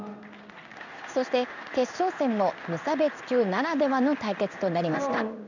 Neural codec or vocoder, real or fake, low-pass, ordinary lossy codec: codec, 16 kHz in and 24 kHz out, 1 kbps, XY-Tokenizer; fake; 7.2 kHz; none